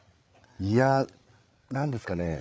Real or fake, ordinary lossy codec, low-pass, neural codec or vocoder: fake; none; none; codec, 16 kHz, 16 kbps, FreqCodec, larger model